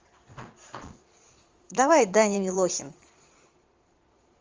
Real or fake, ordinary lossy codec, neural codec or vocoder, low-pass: fake; Opus, 32 kbps; vocoder, 22.05 kHz, 80 mel bands, Vocos; 7.2 kHz